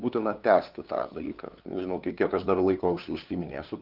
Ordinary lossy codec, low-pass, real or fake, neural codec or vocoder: Opus, 24 kbps; 5.4 kHz; fake; codec, 16 kHz, 2 kbps, FunCodec, trained on LibriTTS, 25 frames a second